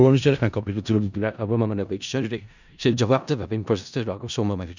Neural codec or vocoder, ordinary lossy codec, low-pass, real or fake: codec, 16 kHz in and 24 kHz out, 0.4 kbps, LongCat-Audio-Codec, four codebook decoder; none; 7.2 kHz; fake